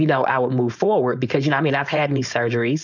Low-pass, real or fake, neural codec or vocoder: 7.2 kHz; fake; codec, 16 kHz, 4.8 kbps, FACodec